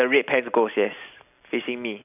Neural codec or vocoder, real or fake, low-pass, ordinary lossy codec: none; real; 3.6 kHz; none